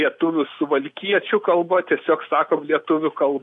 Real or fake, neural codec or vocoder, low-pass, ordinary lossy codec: real; none; 10.8 kHz; AAC, 64 kbps